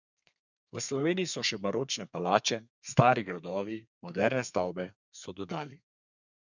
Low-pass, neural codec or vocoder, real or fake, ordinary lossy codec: 7.2 kHz; codec, 32 kHz, 1.9 kbps, SNAC; fake; none